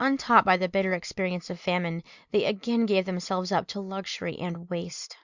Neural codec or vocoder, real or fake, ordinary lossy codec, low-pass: none; real; Opus, 64 kbps; 7.2 kHz